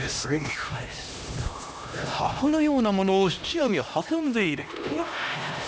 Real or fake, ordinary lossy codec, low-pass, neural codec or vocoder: fake; none; none; codec, 16 kHz, 1 kbps, X-Codec, HuBERT features, trained on LibriSpeech